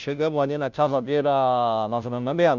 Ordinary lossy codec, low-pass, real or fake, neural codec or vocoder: none; 7.2 kHz; fake; codec, 16 kHz, 0.5 kbps, FunCodec, trained on Chinese and English, 25 frames a second